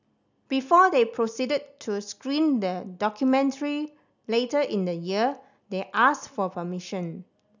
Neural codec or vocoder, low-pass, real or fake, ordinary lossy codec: none; 7.2 kHz; real; none